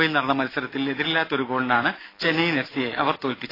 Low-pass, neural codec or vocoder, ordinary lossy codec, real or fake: 5.4 kHz; none; AAC, 24 kbps; real